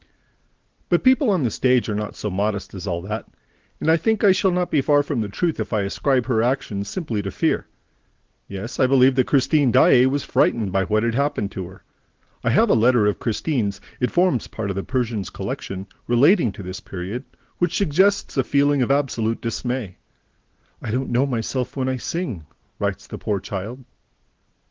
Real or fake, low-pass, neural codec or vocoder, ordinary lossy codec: real; 7.2 kHz; none; Opus, 16 kbps